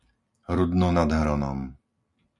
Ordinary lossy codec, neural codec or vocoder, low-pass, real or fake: MP3, 96 kbps; none; 10.8 kHz; real